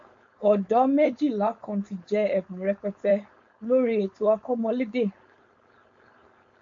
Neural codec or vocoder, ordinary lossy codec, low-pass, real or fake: codec, 16 kHz, 4.8 kbps, FACodec; MP3, 48 kbps; 7.2 kHz; fake